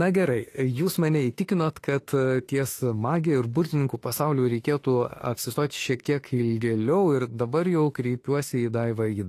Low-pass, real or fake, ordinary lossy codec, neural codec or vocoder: 14.4 kHz; fake; AAC, 48 kbps; autoencoder, 48 kHz, 32 numbers a frame, DAC-VAE, trained on Japanese speech